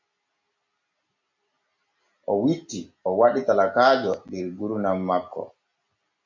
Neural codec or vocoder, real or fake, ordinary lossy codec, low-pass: none; real; MP3, 48 kbps; 7.2 kHz